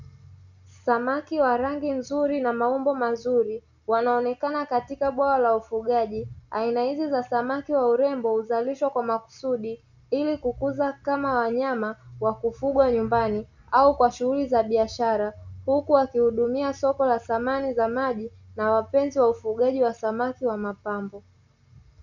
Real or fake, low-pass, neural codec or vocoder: real; 7.2 kHz; none